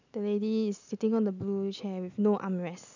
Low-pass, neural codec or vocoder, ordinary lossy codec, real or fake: 7.2 kHz; none; none; real